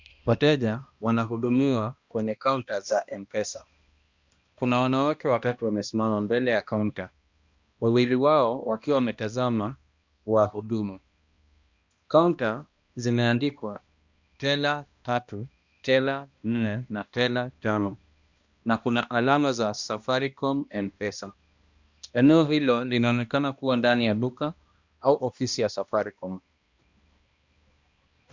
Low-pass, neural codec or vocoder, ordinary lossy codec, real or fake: 7.2 kHz; codec, 16 kHz, 1 kbps, X-Codec, HuBERT features, trained on balanced general audio; Opus, 64 kbps; fake